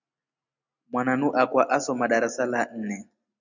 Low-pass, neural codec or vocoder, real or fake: 7.2 kHz; none; real